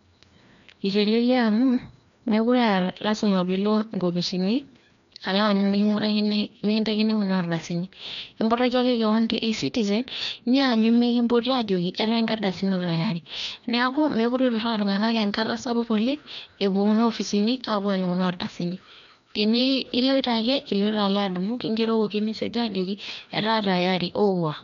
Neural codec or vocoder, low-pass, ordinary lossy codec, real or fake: codec, 16 kHz, 1 kbps, FreqCodec, larger model; 7.2 kHz; MP3, 96 kbps; fake